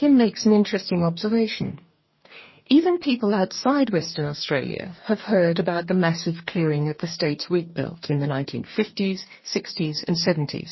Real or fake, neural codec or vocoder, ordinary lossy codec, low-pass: fake; codec, 44.1 kHz, 2.6 kbps, DAC; MP3, 24 kbps; 7.2 kHz